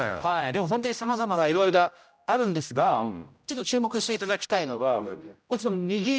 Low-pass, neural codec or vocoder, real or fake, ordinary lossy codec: none; codec, 16 kHz, 0.5 kbps, X-Codec, HuBERT features, trained on general audio; fake; none